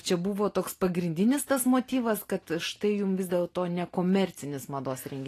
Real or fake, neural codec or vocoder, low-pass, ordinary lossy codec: real; none; 14.4 kHz; AAC, 48 kbps